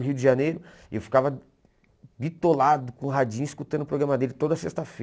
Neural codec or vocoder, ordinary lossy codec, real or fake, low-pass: none; none; real; none